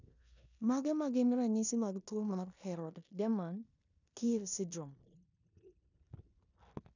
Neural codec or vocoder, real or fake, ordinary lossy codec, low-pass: codec, 16 kHz in and 24 kHz out, 0.9 kbps, LongCat-Audio-Codec, four codebook decoder; fake; none; 7.2 kHz